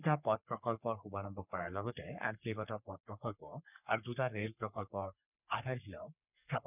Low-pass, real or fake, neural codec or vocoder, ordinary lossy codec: 3.6 kHz; fake; codec, 44.1 kHz, 3.4 kbps, Pupu-Codec; none